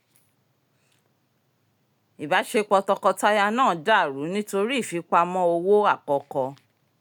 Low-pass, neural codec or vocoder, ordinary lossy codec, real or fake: 19.8 kHz; none; none; real